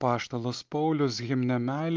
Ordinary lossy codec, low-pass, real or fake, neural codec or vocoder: Opus, 24 kbps; 7.2 kHz; real; none